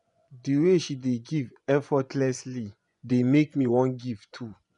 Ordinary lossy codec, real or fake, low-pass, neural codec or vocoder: MP3, 96 kbps; fake; 10.8 kHz; vocoder, 24 kHz, 100 mel bands, Vocos